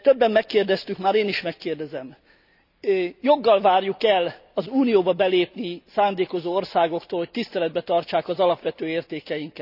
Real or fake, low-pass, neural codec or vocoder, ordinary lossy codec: real; 5.4 kHz; none; none